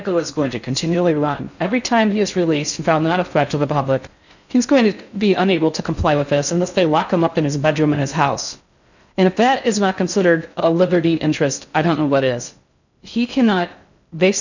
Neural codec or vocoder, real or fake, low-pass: codec, 16 kHz in and 24 kHz out, 0.6 kbps, FocalCodec, streaming, 4096 codes; fake; 7.2 kHz